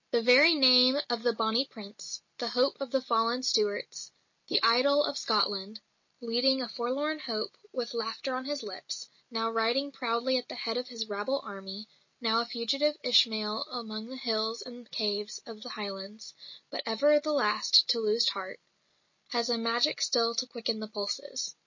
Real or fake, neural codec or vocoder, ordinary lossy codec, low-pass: real; none; MP3, 32 kbps; 7.2 kHz